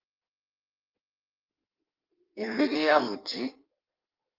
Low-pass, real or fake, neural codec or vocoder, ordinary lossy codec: 5.4 kHz; fake; codec, 16 kHz in and 24 kHz out, 1.1 kbps, FireRedTTS-2 codec; Opus, 24 kbps